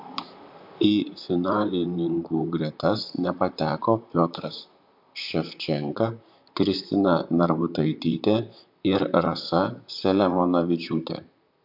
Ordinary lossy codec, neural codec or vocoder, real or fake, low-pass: MP3, 48 kbps; vocoder, 44.1 kHz, 128 mel bands, Pupu-Vocoder; fake; 5.4 kHz